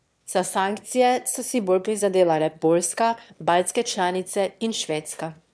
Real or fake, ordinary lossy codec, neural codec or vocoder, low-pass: fake; none; autoencoder, 22.05 kHz, a latent of 192 numbers a frame, VITS, trained on one speaker; none